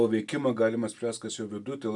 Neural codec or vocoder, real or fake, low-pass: none; real; 10.8 kHz